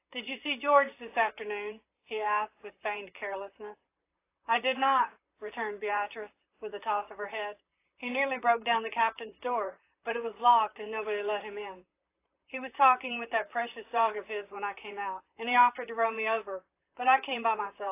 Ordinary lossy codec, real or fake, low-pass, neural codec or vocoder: AAC, 24 kbps; fake; 3.6 kHz; vocoder, 44.1 kHz, 128 mel bands, Pupu-Vocoder